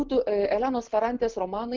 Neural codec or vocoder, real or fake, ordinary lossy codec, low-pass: none; real; Opus, 16 kbps; 7.2 kHz